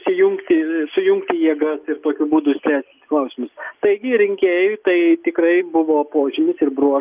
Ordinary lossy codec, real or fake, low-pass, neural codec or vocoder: Opus, 24 kbps; real; 3.6 kHz; none